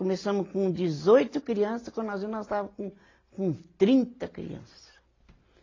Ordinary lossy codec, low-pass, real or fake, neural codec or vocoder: AAC, 32 kbps; 7.2 kHz; real; none